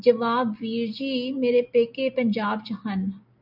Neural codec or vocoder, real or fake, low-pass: none; real; 5.4 kHz